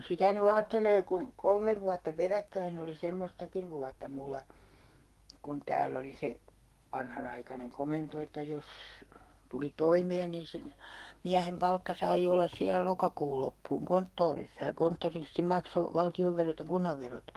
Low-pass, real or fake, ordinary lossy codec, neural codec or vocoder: 14.4 kHz; fake; Opus, 32 kbps; codec, 32 kHz, 1.9 kbps, SNAC